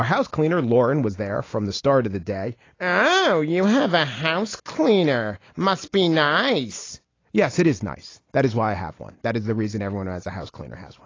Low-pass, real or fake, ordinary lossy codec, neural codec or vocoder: 7.2 kHz; real; AAC, 32 kbps; none